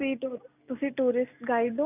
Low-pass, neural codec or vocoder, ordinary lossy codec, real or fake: 3.6 kHz; none; none; real